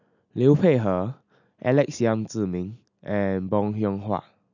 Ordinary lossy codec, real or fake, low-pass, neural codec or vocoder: none; real; 7.2 kHz; none